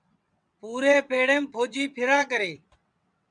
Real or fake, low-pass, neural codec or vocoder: fake; 9.9 kHz; vocoder, 22.05 kHz, 80 mel bands, WaveNeXt